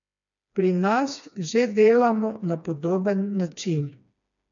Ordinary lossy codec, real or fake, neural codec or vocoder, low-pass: none; fake; codec, 16 kHz, 2 kbps, FreqCodec, smaller model; 7.2 kHz